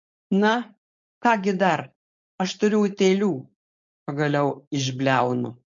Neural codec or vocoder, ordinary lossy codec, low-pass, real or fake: codec, 16 kHz, 4.8 kbps, FACodec; MP3, 48 kbps; 7.2 kHz; fake